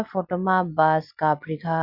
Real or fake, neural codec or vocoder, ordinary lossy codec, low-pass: real; none; none; 5.4 kHz